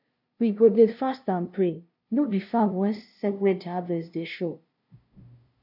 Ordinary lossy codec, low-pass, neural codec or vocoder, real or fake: none; 5.4 kHz; codec, 16 kHz, 0.5 kbps, FunCodec, trained on LibriTTS, 25 frames a second; fake